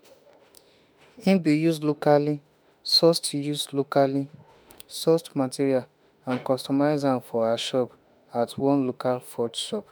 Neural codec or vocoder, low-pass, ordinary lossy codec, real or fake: autoencoder, 48 kHz, 32 numbers a frame, DAC-VAE, trained on Japanese speech; none; none; fake